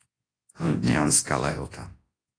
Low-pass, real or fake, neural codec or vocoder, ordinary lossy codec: 9.9 kHz; fake; codec, 24 kHz, 0.9 kbps, WavTokenizer, large speech release; AAC, 32 kbps